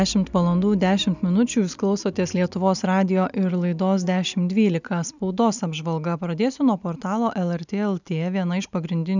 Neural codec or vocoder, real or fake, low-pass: none; real; 7.2 kHz